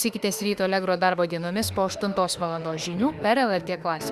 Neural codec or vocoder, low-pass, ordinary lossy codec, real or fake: autoencoder, 48 kHz, 32 numbers a frame, DAC-VAE, trained on Japanese speech; 14.4 kHz; Opus, 64 kbps; fake